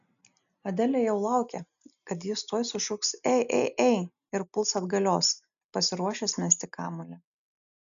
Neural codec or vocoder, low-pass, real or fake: none; 7.2 kHz; real